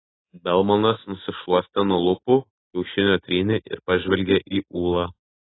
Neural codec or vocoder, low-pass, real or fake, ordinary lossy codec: none; 7.2 kHz; real; AAC, 16 kbps